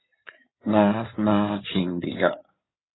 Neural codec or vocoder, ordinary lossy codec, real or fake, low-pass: codec, 16 kHz in and 24 kHz out, 2.2 kbps, FireRedTTS-2 codec; AAC, 16 kbps; fake; 7.2 kHz